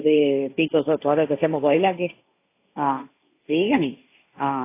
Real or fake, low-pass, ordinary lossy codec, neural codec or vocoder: fake; 3.6 kHz; AAC, 24 kbps; codec, 16 kHz, 1.1 kbps, Voila-Tokenizer